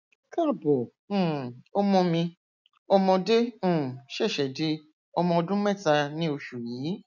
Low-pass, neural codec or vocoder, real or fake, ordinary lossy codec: 7.2 kHz; none; real; none